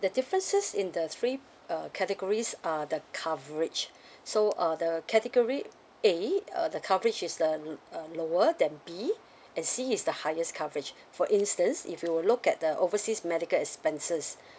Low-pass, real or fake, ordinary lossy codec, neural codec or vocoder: none; real; none; none